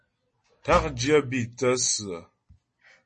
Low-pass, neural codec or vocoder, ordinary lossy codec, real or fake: 9.9 kHz; none; MP3, 32 kbps; real